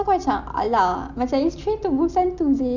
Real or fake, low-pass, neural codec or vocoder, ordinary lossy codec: real; 7.2 kHz; none; none